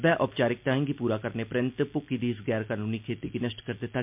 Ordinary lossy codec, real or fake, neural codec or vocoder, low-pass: MP3, 32 kbps; real; none; 3.6 kHz